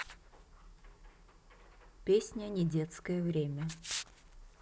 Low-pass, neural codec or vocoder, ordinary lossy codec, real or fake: none; none; none; real